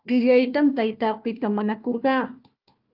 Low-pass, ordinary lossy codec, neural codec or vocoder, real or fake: 5.4 kHz; Opus, 32 kbps; codec, 16 kHz, 1 kbps, FunCodec, trained on Chinese and English, 50 frames a second; fake